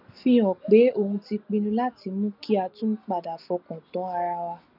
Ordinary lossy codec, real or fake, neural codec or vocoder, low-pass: none; real; none; 5.4 kHz